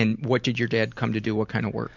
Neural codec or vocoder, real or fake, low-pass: none; real; 7.2 kHz